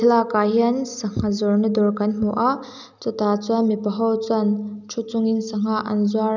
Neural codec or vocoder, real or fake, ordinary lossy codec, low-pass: none; real; none; 7.2 kHz